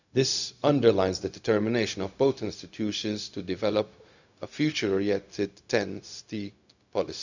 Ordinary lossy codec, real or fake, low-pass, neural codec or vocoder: none; fake; 7.2 kHz; codec, 16 kHz, 0.4 kbps, LongCat-Audio-Codec